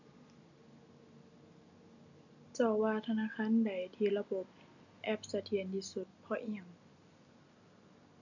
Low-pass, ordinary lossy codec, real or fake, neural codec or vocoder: 7.2 kHz; none; real; none